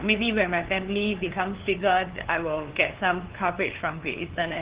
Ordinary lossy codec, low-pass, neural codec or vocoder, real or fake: Opus, 16 kbps; 3.6 kHz; codec, 16 kHz, 2 kbps, FunCodec, trained on LibriTTS, 25 frames a second; fake